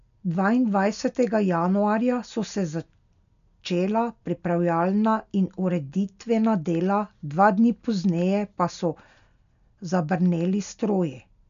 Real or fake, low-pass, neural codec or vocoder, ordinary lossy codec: real; 7.2 kHz; none; none